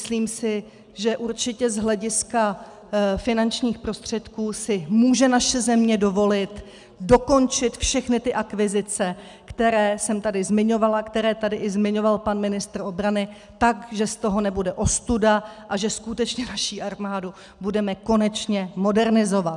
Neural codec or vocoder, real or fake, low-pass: none; real; 10.8 kHz